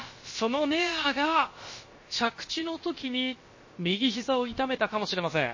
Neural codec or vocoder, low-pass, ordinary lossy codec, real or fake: codec, 16 kHz, about 1 kbps, DyCAST, with the encoder's durations; 7.2 kHz; MP3, 32 kbps; fake